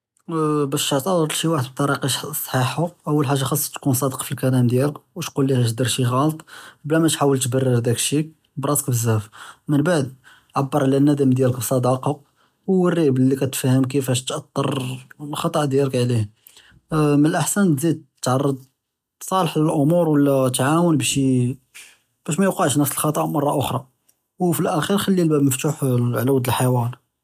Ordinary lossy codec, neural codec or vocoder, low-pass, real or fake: none; none; 14.4 kHz; real